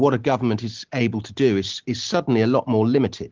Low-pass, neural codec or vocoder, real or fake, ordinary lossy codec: 7.2 kHz; none; real; Opus, 16 kbps